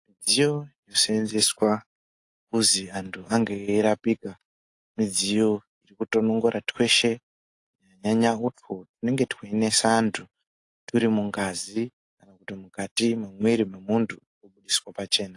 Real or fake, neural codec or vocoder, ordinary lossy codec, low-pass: real; none; AAC, 48 kbps; 10.8 kHz